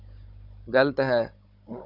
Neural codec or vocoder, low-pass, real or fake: codec, 16 kHz, 16 kbps, FunCodec, trained on Chinese and English, 50 frames a second; 5.4 kHz; fake